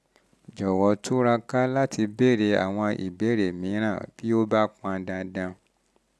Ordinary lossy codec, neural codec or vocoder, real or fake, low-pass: none; none; real; none